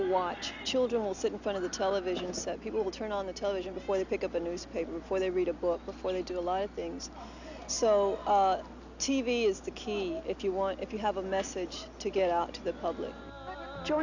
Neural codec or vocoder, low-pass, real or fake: none; 7.2 kHz; real